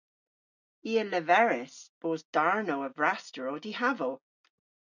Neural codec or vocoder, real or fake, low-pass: none; real; 7.2 kHz